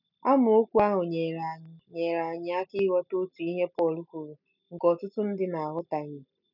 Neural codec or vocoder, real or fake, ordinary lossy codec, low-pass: none; real; none; 5.4 kHz